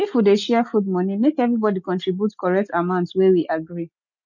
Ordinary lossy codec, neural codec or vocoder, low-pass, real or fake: none; none; 7.2 kHz; real